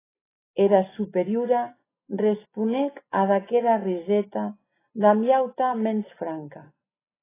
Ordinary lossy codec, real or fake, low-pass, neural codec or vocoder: AAC, 16 kbps; real; 3.6 kHz; none